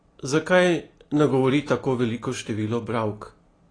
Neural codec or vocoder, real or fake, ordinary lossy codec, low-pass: none; real; AAC, 32 kbps; 9.9 kHz